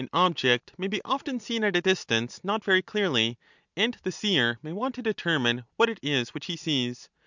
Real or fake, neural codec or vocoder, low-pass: real; none; 7.2 kHz